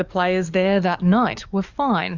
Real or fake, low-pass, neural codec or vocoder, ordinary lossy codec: fake; 7.2 kHz; codec, 44.1 kHz, 7.8 kbps, DAC; Opus, 64 kbps